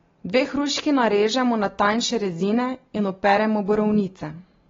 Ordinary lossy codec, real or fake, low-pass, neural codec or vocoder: AAC, 24 kbps; real; 7.2 kHz; none